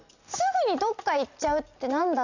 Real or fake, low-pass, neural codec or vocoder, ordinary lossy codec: real; 7.2 kHz; none; none